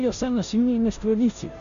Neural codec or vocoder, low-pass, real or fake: codec, 16 kHz, 0.5 kbps, FunCodec, trained on Chinese and English, 25 frames a second; 7.2 kHz; fake